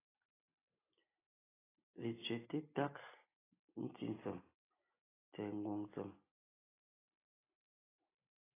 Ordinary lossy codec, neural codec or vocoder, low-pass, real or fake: AAC, 16 kbps; none; 3.6 kHz; real